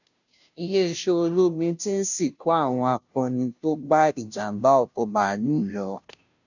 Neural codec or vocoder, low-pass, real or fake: codec, 16 kHz, 0.5 kbps, FunCodec, trained on Chinese and English, 25 frames a second; 7.2 kHz; fake